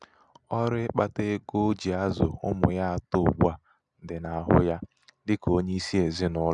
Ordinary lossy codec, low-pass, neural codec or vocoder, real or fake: none; 10.8 kHz; none; real